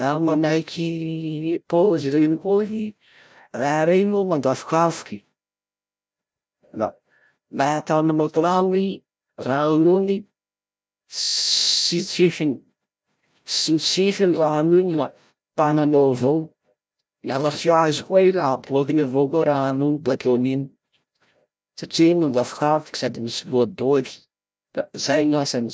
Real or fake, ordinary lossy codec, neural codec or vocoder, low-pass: fake; none; codec, 16 kHz, 0.5 kbps, FreqCodec, larger model; none